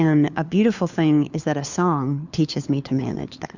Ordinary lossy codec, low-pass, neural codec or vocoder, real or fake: Opus, 64 kbps; 7.2 kHz; codec, 16 kHz, 4 kbps, FunCodec, trained on LibriTTS, 50 frames a second; fake